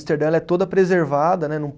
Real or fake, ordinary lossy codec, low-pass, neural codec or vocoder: real; none; none; none